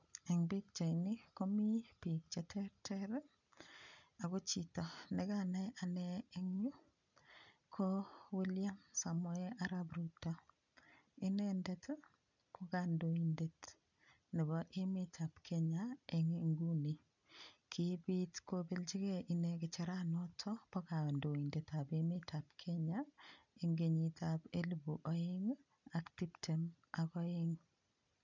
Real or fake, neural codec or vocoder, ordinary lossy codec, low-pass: real; none; none; 7.2 kHz